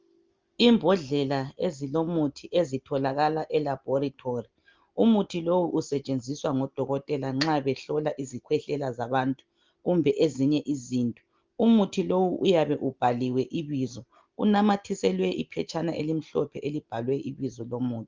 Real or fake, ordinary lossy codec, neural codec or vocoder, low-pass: real; Opus, 32 kbps; none; 7.2 kHz